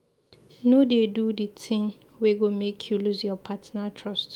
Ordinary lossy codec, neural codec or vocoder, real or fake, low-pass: Opus, 32 kbps; autoencoder, 48 kHz, 128 numbers a frame, DAC-VAE, trained on Japanese speech; fake; 19.8 kHz